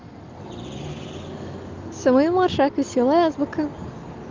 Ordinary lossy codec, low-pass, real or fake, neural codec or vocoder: Opus, 24 kbps; 7.2 kHz; real; none